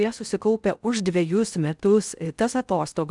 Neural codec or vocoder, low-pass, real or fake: codec, 16 kHz in and 24 kHz out, 0.6 kbps, FocalCodec, streaming, 4096 codes; 10.8 kHz; fake